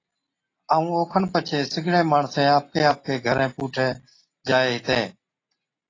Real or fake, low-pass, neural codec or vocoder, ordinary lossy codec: real; 7.2 kHz; none; AAC, 32 kbps